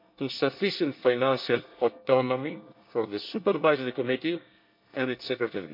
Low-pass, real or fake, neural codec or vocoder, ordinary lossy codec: 5.4 kHz; fake; codec, 24 kHz, 1 kbps, SNAC; MP3, 48 kbps